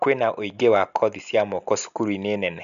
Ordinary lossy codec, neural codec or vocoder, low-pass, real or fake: none; none; 7.2 kHz; real